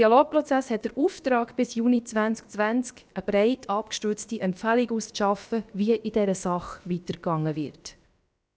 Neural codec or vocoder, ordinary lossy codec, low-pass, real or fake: codec, 16 kHz, about 1 kbps, DyCAST, with the encoder's durations; none; none; fake